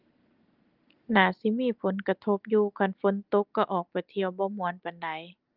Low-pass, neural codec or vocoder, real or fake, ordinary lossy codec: 5.4 kHz; none; real; Opus, 24 kbps